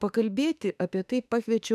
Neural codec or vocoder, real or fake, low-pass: autoencoder, 48 kHz, 32 numbers a frame, DAC-VAE, trained on Japanese speech; fake; 14.4 kHz